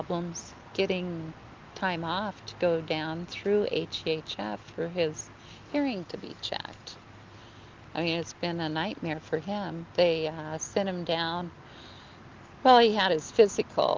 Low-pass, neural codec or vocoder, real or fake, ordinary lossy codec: 7.2 kHz; none; real; Opus, 32 kbps